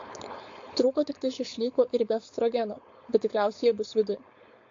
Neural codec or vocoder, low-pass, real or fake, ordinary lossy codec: codec, 16 kHz, 4.8 kbps, FACodec; 7.2 kHz; fake; AAC, 48 kbps